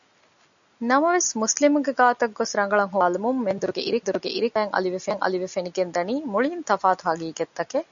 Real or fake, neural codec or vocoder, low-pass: real; none; 7.2 kHz